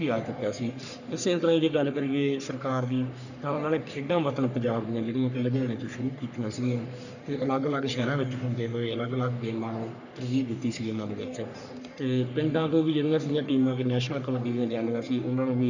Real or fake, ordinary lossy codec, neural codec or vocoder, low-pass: fake; none; codec, 44.1 kHz, 3.4 kbps, Pupu-Codec; 7.2 kHz